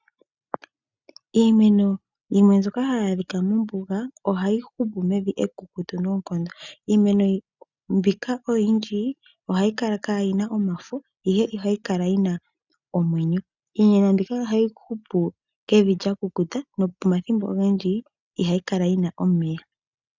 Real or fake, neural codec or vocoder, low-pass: real; none; 7.2 kHz